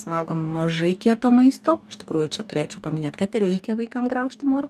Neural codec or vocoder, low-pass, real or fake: codec, 44.1 kHz, 2.6 kbps, DAC; 14.4 kHz; fake